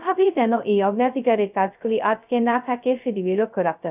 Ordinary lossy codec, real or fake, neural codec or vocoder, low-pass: none; fake; codec, 16 kHz, 0.2 kbps, FocalCodec; 3.6 kHz